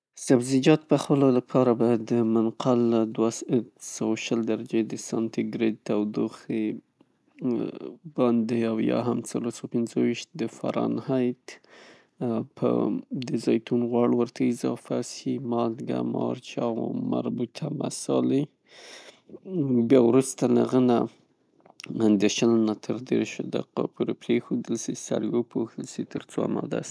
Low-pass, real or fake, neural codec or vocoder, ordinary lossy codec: none; real; none; none